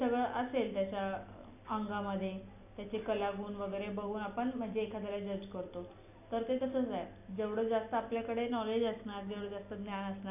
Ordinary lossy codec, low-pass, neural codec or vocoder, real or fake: none; 3.6 kHz; none; real